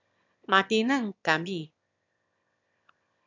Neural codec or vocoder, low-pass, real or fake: autoencoder, 22.05 kHz, a latent of 192 numbers a frame, VITS, trained on one speaker; 7.2 kHz; fake